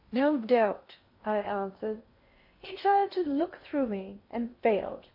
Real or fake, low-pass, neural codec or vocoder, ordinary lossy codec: fake; 5.4 kHz; codec, 16 kHz in and 24 kHz out, 0.8 kbps, FocalCodec, streaming, 65536 codes; MP3, 32 kbps